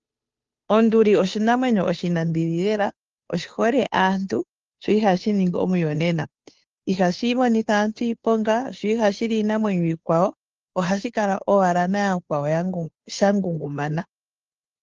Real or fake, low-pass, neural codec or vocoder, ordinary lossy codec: fake; 7.2 kHz; codec, 16 kHz, 2 kbps, FunCodec, trained on Chinese and English, 25 frames a second; Opus, 32 kbps